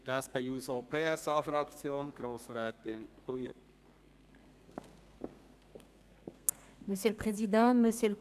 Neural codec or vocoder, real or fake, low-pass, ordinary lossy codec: codec, 32 kHz, 1.9 kbps, SNAC; fake; 14.4 kHz; none